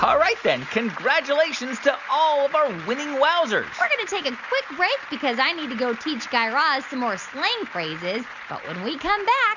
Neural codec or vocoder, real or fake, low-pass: none; real; 7.2 kHz